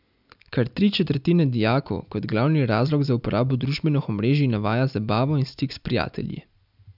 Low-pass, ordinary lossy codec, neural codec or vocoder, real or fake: 5.4 kHz; none; none; real